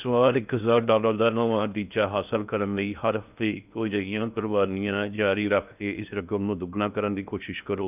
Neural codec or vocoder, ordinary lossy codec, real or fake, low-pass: codec, 16 kHz in and 24 kHz out, 0.6 kbps, FocalCodec, streaming, 2048 codes; none; fake; 3.6 kHz